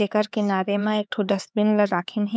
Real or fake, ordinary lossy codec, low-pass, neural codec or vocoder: fake; none; none; codec, 16 kHz, 4 kbps, X-Codec, HuBERT features, trained on LibriSpeech